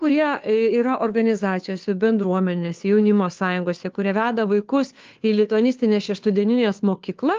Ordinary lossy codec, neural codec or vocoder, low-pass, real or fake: Opus, 32 kbps; codec, 16 kHz, 2 kbps, FunCodec, trained on Chinese and English, 25 frames a second; 7.2 kHz; fake